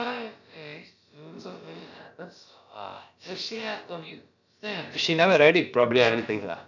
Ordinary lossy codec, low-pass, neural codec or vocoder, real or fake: none; 7.2 kHz; codec, 16 kHz, about 1 kbps, DyCAST, with the encoder's durations; fake